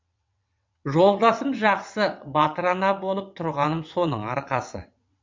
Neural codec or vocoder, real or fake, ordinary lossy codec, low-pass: vocoder, 22.05 kHz, 80 mel bands, WaveNeXt; fake; MP3, 48 kbps; 7.2 kHz